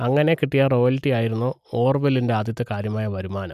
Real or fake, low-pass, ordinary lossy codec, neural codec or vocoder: real; 14.4 kHz; none; none